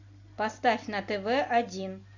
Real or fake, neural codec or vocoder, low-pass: real; none; 7.2 kHz